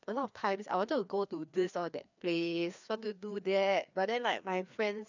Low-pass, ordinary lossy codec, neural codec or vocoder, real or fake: 7.2 kHz; none; codec, 16 kHz, 2 kbps, FreqCodec, larger model; fake